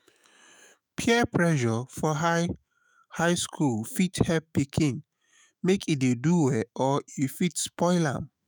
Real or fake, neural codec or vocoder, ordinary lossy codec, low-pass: fake; autoencoder, 48 kHz, 128 numbers a frame, DAC-VAE, trained on Japanese speech; none; none